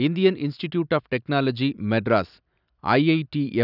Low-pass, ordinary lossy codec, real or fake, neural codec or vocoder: 5.4 kHz; none; real; none